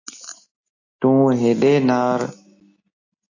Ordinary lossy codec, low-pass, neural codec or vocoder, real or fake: AAC, 48 kbps; 7.2 kHz; none; real